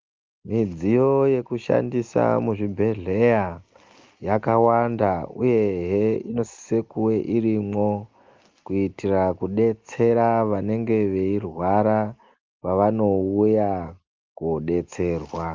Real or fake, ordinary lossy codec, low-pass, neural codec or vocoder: real; Opus, 32 kbps; 7.2 kHz; none